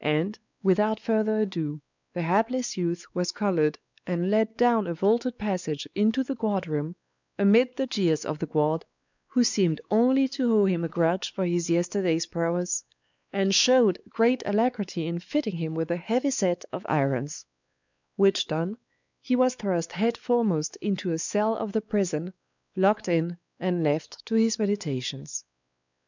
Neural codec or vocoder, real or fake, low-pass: codec, 16 kHz, 4 kbps, X-Codec, WavLM features, trained on Multilingual LibriSpeech; fake; 7.2 kHz